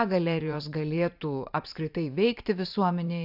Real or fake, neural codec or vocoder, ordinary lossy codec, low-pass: fake; vocoder, 44.1 kHz, 128 mel bands every 512 samples, BigVGAN v2; AAC, 48 kbps; 5.4 kHz